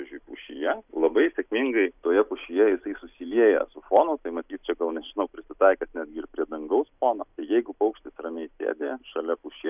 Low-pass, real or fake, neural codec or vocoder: 3.6 kHz; real; none